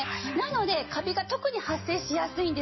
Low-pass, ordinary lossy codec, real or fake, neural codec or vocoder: 7.2 kHz; MP3, 24 kbps; real; none